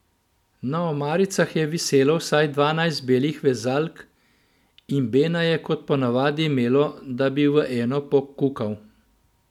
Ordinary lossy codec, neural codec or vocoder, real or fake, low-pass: none; none; real; 19.8 kHz